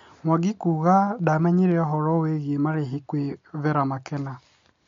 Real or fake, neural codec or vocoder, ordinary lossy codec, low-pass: real; none; MP3, 48 kbps; 7.2 kHz